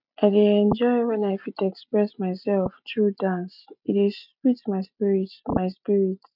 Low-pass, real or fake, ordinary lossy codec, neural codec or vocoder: 5.4 kHz; real; none; none